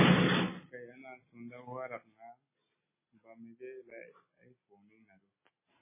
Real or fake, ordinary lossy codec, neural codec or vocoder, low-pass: real; MP3, 16 kbps; none; 3.6 kHz